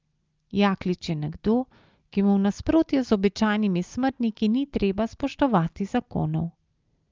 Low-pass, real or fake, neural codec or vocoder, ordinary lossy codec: 7.2 kHz; real; none; Opus, 32 kbps